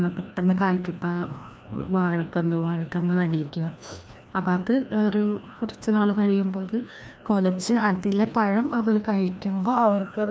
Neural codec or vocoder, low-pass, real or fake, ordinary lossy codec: codec, 16 kHz, 1 kbps, FreqCodec, larger model; none; fake; none